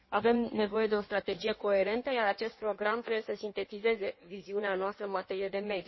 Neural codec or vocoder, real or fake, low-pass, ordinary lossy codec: codec, 16 kHz in and 24 kHz out, 1.1 kbps, FireRedTTS-2 codec; fake; 7.2 kHz; MP3, 24 kbps